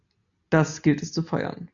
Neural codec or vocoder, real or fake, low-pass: none; real; 7.2 kHz